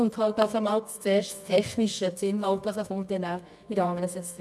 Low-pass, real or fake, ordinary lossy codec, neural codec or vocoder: none; fake; none; codec, 24 kHz, 0.9 kbps, WavTokenizer, medium music audio release